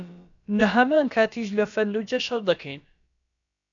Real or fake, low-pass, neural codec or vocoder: fake; 7.2 kHz; codec, 16 kHz, about 1 kbps, DyCAST, with the encoder's durations